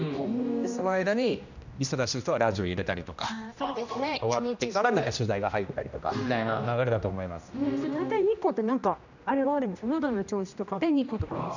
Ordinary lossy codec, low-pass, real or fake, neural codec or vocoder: none; 7.2 kHz; fake; codec, 16 kHz, 1 kbps, X-Codec, HuBERT features, trained on general audio